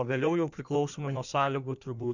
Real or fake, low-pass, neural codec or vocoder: fake; 7.2 kHz; codec, 16 kHz in and 24 kHz out, 1.1 kbps, FireRedTTS-2 codec